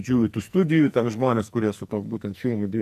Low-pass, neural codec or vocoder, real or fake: 14.4 kHz; codec, 44.1 kHz, 2.6 kbps, SNAC; fake